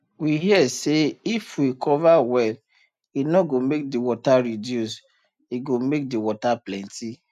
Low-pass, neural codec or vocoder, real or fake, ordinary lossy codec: 14.4 kHz; none; real; none